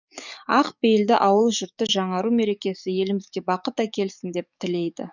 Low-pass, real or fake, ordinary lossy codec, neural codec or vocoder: 7.2 kHz; fake; none; codec, 44.1 kHz, 7.8 kbps, DAC